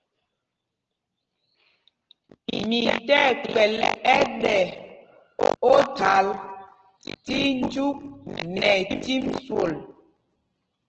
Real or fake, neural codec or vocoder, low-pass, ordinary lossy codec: real; none; 7.2 kHz; Opus, 16 kbps